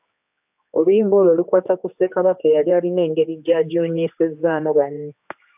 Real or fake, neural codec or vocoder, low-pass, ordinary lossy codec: fake; codec, 16 kHz, 2 kbps, X-Codec, HuBERT features, trained on general audio; 3.6 kHz; AAC, 32 kbps